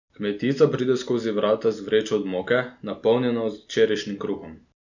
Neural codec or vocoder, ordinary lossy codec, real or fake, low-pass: none; none; real; 7.2 kHz